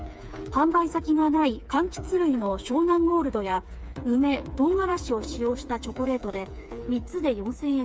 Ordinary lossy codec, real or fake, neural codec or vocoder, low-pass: none; fake; codec, 16 kHz, 4 kbps, FreqCodec, smaller model; none